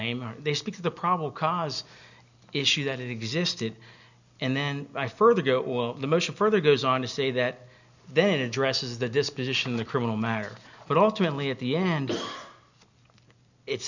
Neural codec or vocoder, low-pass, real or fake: none; 7.2 kHz; real